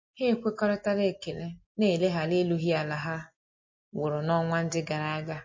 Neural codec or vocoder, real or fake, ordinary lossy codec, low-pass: none; real; MP3, 32 kbps; 7.2 kHz